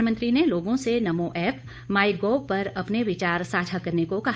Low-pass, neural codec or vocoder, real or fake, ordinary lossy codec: none; codec, 16 kHz, 8 kbps, FunCodec, trained on Chinese and English, 25 frames a second; fake; none